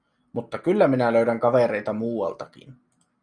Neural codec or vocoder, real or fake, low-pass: none; real; 9.9 kHz